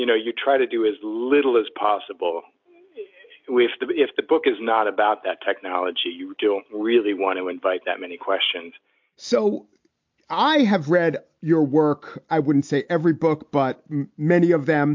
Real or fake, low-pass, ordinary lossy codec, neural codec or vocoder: real; 7.2 kHz; MP3, 48 kbps; none